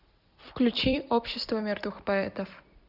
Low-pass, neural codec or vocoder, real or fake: 5.4 kHz; vocoder, 44.1 kHz, 128 mel bands every 256 samples, BigVGAN v2; fake